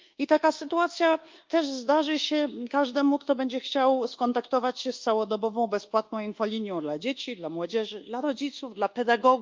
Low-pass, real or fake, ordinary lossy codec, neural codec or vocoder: 7.2 kHz; fake; Opus, 24 kbps; codec, 24 kHz, 1.2 kbps, DualCodec